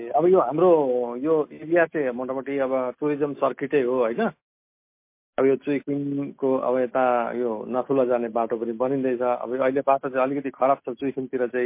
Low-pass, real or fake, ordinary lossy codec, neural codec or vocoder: 3.6 kHz; real; MP3, 24 kbps; none